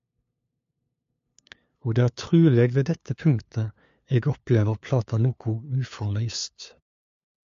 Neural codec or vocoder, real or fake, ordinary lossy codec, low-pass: codec, 16 kHz, 2 kbps, FunCodec, trained on LibriTTS, 25 frames a second; fake; MP3, 48 kbps; 7.2 kHz